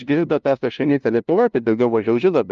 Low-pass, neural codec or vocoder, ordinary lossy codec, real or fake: 7.2 kHz; codec, 16 kHz, 0.5 kbps, FunCodec, trained on LibriTTS, 25 frames a second; Opus, 32 kbps; fake